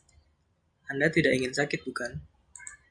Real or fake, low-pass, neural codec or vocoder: real; 9.9 kHz; none